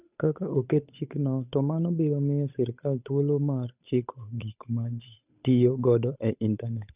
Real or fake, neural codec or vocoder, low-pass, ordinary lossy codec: fake; codec, 16 kHz, 8 kbps, FunCodec, trained on Chinese and English, 25 frames a second; 3.6 kHz; none